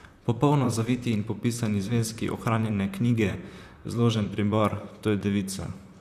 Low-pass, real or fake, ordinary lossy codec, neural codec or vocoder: 14.4 kHz; fake; none; vocoder, 44.1 kHz, 128 mel bands, Pupu-Vocoder